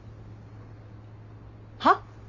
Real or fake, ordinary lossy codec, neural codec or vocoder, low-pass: real; none; none; 7.2 kHz